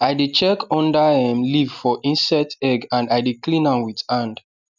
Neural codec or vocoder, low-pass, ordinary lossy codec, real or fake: none; 7.2 kHz; none; real